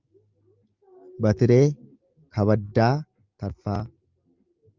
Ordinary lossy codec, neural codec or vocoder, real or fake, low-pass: Opus, 24 kbps; none; real; 7.2 kHz